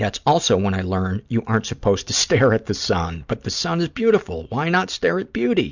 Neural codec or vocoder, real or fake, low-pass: none; real; 7.2 kHz